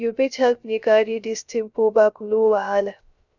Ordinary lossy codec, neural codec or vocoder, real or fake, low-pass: none; codec, 16 kHz, 0.3 kbps, FocalCodec; fake; 7.2 kHz